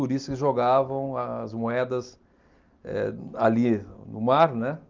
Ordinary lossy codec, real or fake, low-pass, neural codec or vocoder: Opus, 32 kbps; real; 7.2 kHz; none